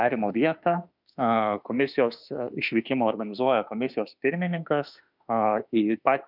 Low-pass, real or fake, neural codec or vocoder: 5.4 kHz; fake; autoencoder, 48 kHz, 32 numbers a frame, DAC-VAE, trained on Japanese speech